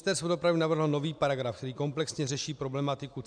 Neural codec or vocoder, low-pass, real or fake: none; 9.9 kHz; real